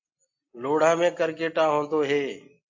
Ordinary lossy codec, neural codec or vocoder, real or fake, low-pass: AAC, 48 kbps; none; real; 7.2 kHz